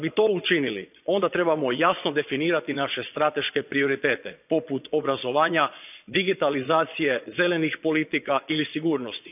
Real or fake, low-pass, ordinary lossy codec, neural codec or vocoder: fake; 3.6 kHz; none; vocoder, 22.05 kHz, 80 mel bands, Vocos